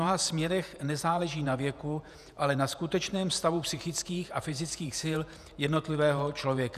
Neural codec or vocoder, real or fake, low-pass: vocoder, 48 kHz, 128 mel bands, Vocos; fake; 14.4 kHz